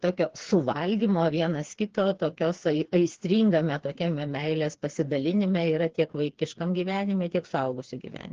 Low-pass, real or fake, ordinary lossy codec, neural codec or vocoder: 7.2 kHz; fake; Opus, 16 kbps; codec, 16 kHz, 4 kbps, FreqCodec, smaller model